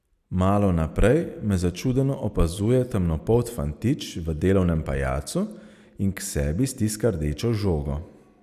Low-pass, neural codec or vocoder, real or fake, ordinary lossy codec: 14.4 kHz; none; real; none